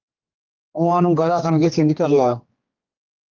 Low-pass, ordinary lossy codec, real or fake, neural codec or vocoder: 7.2 kHz; Opus, 32 kbps; fake; codec, 16 kHz, 2 kbps, X-Codec, HuBERT features, trained on general audio